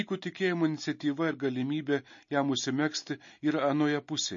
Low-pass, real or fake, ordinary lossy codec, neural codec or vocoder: 7.2 kHz; real; MP3, 32 kbps; none